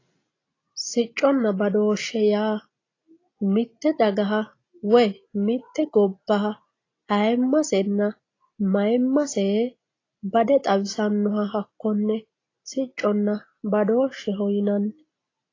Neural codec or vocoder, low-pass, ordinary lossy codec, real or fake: none; 7.2 kHz; AAC, 32 kbps; real